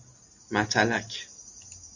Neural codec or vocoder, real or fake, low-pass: none; real; 7.2 kHz